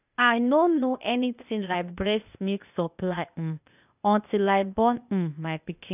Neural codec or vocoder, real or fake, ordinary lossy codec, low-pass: codec, 16 kHz, 0.8 kbps, ZipCodec; fake; none; 3.6 kHz